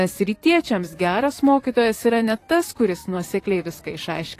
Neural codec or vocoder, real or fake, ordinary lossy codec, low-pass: vocoder, 44.1 kHz, 128 mel bands, Pupu-Vocoder; fake; AAC, 48 kbps; 14.4 kHz